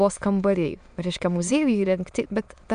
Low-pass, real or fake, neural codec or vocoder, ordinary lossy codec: 9.9 kHz; fake; autoencoder, 22.05 kHz, a latent of 192 numbers a frame, VITS, trained on many speakers; MP3, 96 kbps